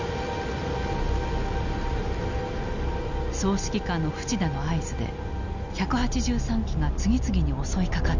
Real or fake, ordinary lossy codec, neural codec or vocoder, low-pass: real; none; none; 7.2 kHz